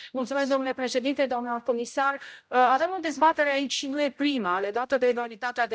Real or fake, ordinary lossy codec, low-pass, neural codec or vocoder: fake; none; none; codec, 16 kHz, 0.5 kbps, X-Codec, HuBERT features, trained on general audio